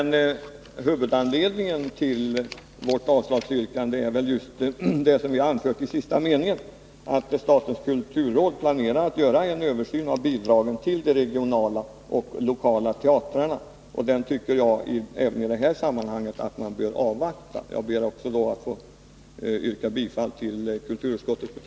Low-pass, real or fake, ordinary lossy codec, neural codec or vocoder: none; real; none; none